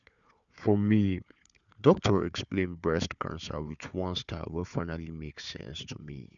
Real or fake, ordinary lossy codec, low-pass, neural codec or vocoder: fake; none; 7.2 kHz; codec, 16 kHz, 4 kbps, FunCodec, trained on Chinese and English, 50 frames a second